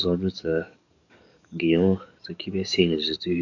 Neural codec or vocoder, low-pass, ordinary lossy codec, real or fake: codec, 44.1 kHz, 7.8 kbps, DAC; 7.2 kHz; none; fake